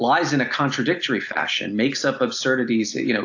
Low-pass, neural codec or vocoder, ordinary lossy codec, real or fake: 7.2 kHz; none; AAC, 48 kbps; real